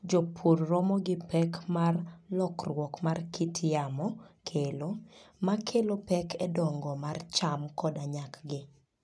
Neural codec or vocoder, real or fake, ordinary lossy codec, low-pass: none; real; none; none